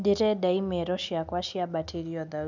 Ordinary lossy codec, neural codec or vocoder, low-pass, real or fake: none; none; 7.2 kHz; real